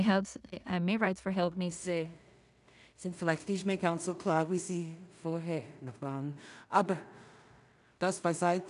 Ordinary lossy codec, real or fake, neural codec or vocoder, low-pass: none; fake; codec, 16 kHz in and 24 kHz out, 0.4 kbps, LongCat-Audio-Codec, two codebook decoder; 10.8 kHz